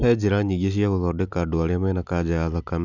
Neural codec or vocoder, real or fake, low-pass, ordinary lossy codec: none; real; 7.2 kHz; none